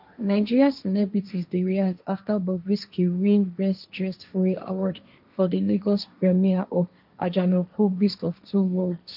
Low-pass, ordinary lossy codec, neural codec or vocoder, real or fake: 5.4 kHz; none; codec, 16 kHz, 1.1 kbps, Voila-Tokenizer; fake